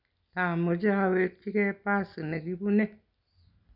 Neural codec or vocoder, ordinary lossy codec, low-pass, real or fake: none; none; 5.4 kHz; real